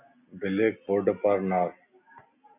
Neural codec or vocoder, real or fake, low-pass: none; real; 3.6 kHz